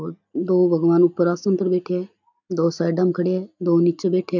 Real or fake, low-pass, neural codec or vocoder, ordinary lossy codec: real; 7.2 kHz; none; none